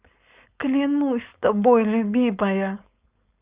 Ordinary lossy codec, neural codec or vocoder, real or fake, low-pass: Opus, 64 kbps; codec, 16 kHz, 4.8 kbps, FACodec; fake; 3.6 kHz